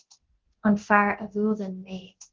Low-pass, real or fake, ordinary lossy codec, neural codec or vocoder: 7.2 kHz; fake; Opus, 16 kbps; codec, 24 kHz, 0.9 kbps, DualCodec